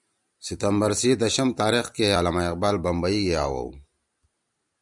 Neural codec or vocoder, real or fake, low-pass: none; real; 10.8 kHz